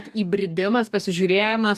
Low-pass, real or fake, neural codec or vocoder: 14.4 kHz; fake; codec, 44.1 kHz, 2.6 kbps, DAC